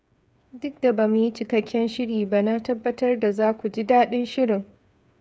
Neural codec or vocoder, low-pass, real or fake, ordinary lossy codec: codec, 16 kHz, 8 kbps, FreqCodec, smaller model; none; fake; none